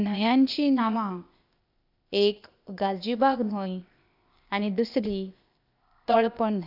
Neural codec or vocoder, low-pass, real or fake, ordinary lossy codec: codec, 16 kHz, 0.8 kbps, ZipCodec; 5.4 kHz; fake; none